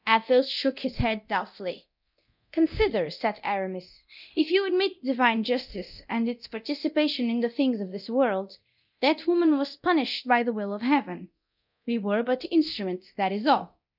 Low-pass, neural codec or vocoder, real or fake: 5.4 kHz; codec, 24 kHz, 0.9 kbps, DualCodec; fake